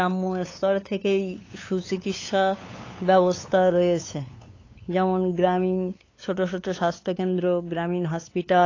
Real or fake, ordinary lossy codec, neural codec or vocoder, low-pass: fake; AAC, 32 kbps; codec, 16 kHz, 8 kbps, FunCodec, trained on LibriTTS, 25 frames a second; 7.2 kHz